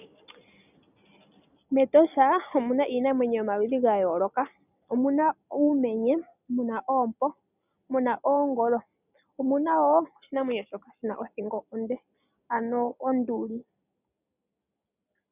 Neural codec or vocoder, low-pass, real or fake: none; 3.6 kHz; real